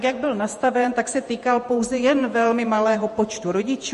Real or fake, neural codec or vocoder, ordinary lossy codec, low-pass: fake; vocoder, 48 kHz, 128 mel bands, Vocos; MP3, 48 kbps; 14.4 kHz